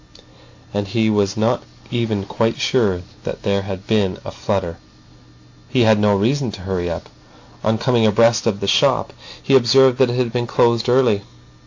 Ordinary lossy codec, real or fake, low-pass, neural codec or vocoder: AAC, 48 kbps; real; 7.2 kHz; none